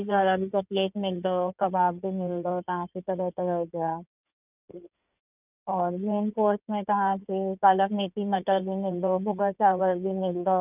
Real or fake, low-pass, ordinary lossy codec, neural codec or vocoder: fake; 3.6 kHz; none; codec, 16 kHz in and 24 kHz out, 2.2 kbps, FireRedTTS-2 codec